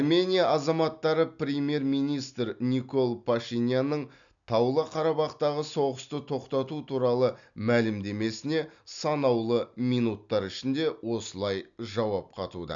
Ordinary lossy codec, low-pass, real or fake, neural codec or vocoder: none; 7.2 kHz; real; none